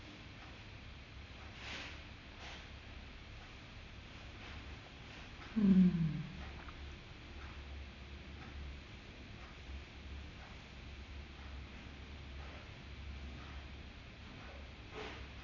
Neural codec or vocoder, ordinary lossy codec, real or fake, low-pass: none; none; real; 7.2 kHz